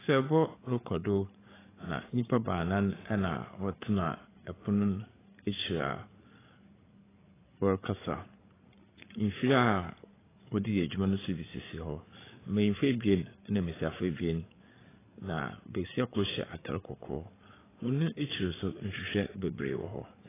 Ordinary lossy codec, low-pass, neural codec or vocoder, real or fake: AAC, 16 kbps; 3.6 kHz; codec, 16 kHz, 8 kbps, FreqCodec, larger model; fake